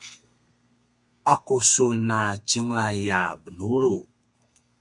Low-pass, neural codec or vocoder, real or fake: 10.8 kHz; codec, 32 kHz, 1.9 kbps, SNAC; fake